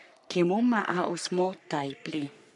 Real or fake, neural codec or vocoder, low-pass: fake; codec, 44.1 kHz, 3.4 kbps, Pupu-Codec; 10.8 kHz